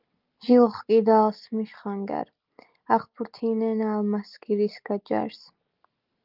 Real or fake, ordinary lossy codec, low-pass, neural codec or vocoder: real; Opus, 32 kbps; 5.4 kHz; none